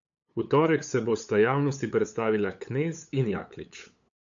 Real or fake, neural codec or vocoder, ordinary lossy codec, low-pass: fake; codec, 16 kHz, 8 kbps, FunCodec, trained on LibriTTS, 25 frames a second; AAC, 64 kbps; 7.2 kHz